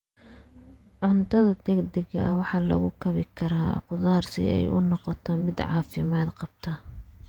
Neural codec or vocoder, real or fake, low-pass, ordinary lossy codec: vocoder, 48 kHz, 128 mel bands, Vocos; fake; 19.8 kHz; Opus, 32 kbps